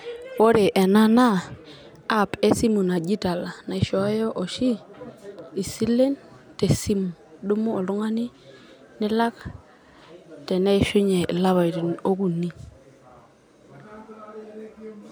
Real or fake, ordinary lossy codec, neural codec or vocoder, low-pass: real; none; none; none